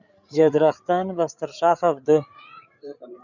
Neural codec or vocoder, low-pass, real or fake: codec, 16 kHz, 8 kbps, FreqCodec, larger model; 7.2 kHz; fake